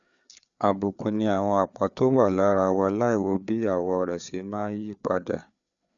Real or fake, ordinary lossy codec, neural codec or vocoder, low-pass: fake; none; codec, 16 kHz, 4 kbps, FreqCodec, larger model; 7.2 kHz